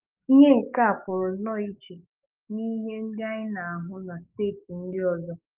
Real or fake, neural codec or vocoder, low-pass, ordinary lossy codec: fake; codec, 44.1 kHz, 7.8 kbps, DAC; 3.6 kHz; Opus, 24 kbps